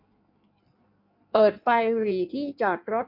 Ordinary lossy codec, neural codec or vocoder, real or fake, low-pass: MP3, 48 kbps; codec, 16 kHz in and 24 kHz out, 1.1 kbps, FireRedTTS-2 codec; fake; 5.4 kHz